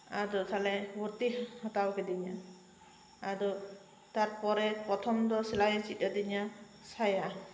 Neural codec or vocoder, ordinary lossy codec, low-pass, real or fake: none; none; none; real